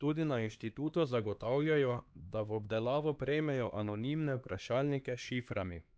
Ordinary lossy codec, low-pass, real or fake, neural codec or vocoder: none; none; fake; codec, 16 kHz, 2 kbps, X-Codec, HuBERT features, trained on LibriSpeech